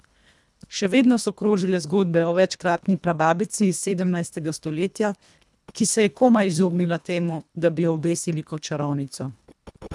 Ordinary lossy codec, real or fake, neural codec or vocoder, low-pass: none; fake; codec, 24 kHz, 1.5 kbps, HILCodec; none